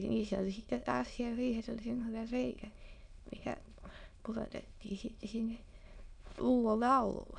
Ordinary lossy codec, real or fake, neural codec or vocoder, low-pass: none; fake; autoencoder, 22.05 kHz, a latent of 192 numbers a frame, VITS, trained on many speakers; 9.9 kHz